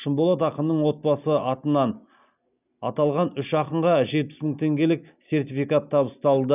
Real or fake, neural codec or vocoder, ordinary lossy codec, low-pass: real; none; none; 3.6 kHz